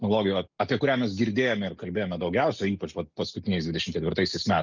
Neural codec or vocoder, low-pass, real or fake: none; 7.2 kHz; real